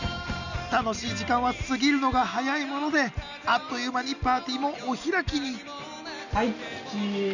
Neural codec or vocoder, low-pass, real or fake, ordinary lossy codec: none; 7.2 kHz; real; none